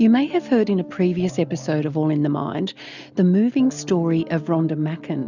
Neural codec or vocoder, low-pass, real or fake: none; 7.2 kHz; real